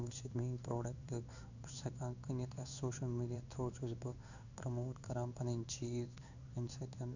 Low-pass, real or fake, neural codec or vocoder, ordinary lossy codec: 7.2 kHz; fake; codec, 16 kHz in and 24 kHz out, 1 kbps, XY-Tokenizer; none